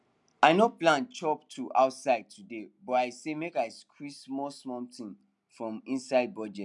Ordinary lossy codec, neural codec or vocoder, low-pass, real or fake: none; none; 10.8 kHz; real